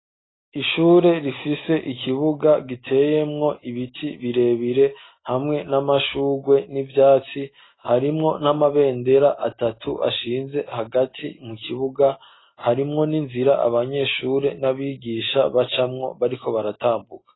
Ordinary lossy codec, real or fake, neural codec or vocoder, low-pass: AAC, 16 kbps; real; none; 7.2 kHz